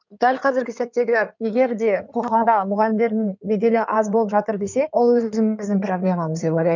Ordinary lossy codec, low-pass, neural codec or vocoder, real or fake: none; 7.2 kHz; codec, 16 kHz in and 24 kHz out, 2.2 kbps, FireRedTTS-2 codec; fake